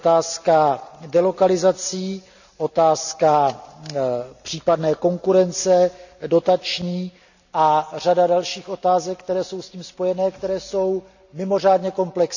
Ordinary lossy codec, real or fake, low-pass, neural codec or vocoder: none; real; 7.2 kHz; none